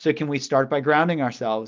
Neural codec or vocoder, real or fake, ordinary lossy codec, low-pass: none; real; Opus, 24 kbps; 7.2 kHz